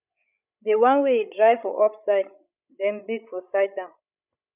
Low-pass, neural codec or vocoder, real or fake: 3.6 kHz; codec, 16 kHz, 16 kbps, FreqCodec, larger model; fake